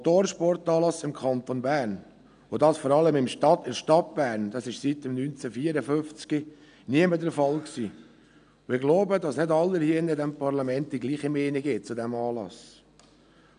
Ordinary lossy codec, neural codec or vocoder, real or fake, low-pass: none; none; real; 9.9 kHz